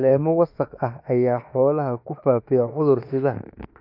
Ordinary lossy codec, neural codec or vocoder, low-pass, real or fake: AAC, 48 kbps; autoencoder, 48 kHz, 32 numbers a frame, DAC-VAE, trained on Japanese speech; 5.4 kHz; fake